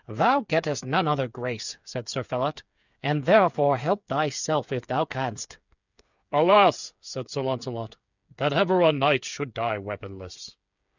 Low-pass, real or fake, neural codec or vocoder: 7.2 kHz; fake; codec, 16 kHz, 8 kbps, FreqCodec, smaller model